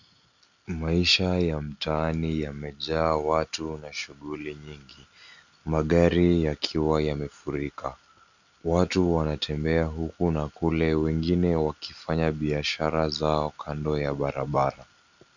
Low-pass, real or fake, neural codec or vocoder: 7.2 kHz; real; none